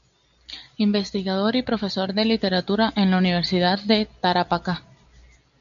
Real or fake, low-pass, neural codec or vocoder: real; 7.2 kHz; none